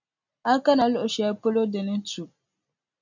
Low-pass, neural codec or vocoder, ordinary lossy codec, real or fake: 7.2 kHz; none; MP3, 64 kbps; real